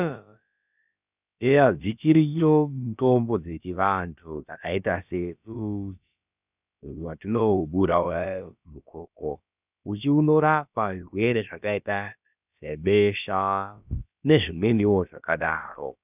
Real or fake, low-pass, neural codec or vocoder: fake; 3.6 kHz; codec, 16 kHz, about 1 kbps, DyCAST, with the encoder's durations